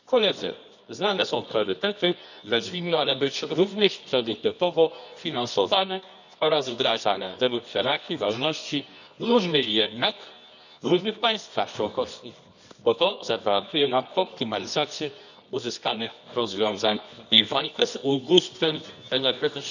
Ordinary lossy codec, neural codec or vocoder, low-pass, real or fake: none; codec, 24 kHz, 0.9 kbps, WavTokenizer, medium music audio release; 7.2 kHz; fake